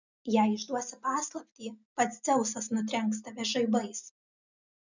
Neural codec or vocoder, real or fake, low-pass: none; real; 7.2 kHz